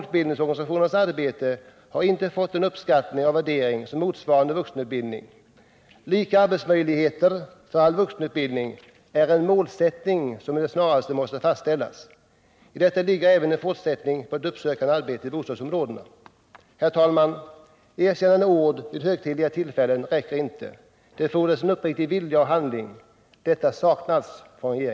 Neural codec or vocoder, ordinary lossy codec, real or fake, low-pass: none; none; real; none